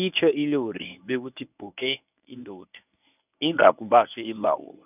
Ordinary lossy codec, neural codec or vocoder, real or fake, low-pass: none; codec, 24 kHz, 0.9 kbps, WavTokenizer, medium speech release version 1; fake; 3.6 kHz